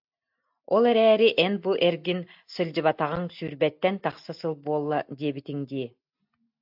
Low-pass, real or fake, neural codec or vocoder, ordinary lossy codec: 5.4 kHz; real; none; AAC, 48 kbps